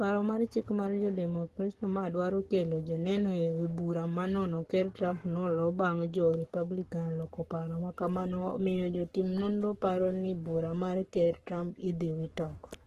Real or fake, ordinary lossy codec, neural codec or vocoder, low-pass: fake; Opus, 16 kbps; codec, 44.1 kHz, 7.8 kbps, Pupu-Codec; 14.4 kHz